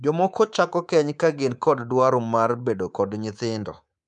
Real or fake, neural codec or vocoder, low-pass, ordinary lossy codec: fake; codec, 24 kHz, 3.1 kbps, DualCodec; 10.8 kHz; none